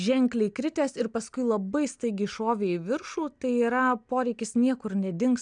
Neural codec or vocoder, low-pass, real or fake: none; 9.9 kHz; real